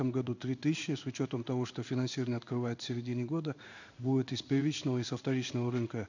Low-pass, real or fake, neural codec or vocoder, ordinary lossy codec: 7.2 kHz; fake; codec, 16 kHz in and 24 kHz out, 1 kbps, XY-Tokenizer; none